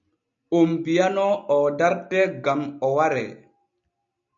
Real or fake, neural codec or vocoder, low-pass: real; none; 7.2 kHz